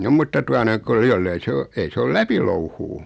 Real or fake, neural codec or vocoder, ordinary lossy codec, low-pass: real; none; none; none